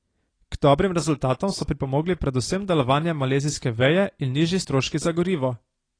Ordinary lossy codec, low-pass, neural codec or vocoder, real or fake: AAC, 32 kbps; 9.9 kHz; none; real